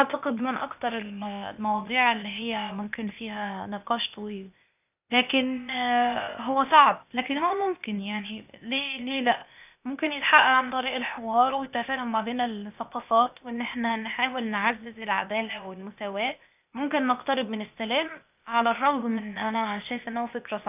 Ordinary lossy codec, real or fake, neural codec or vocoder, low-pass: none; fake; codec, 16 kHz, 0.8 kbps, ZipCodec; 3.6 kHz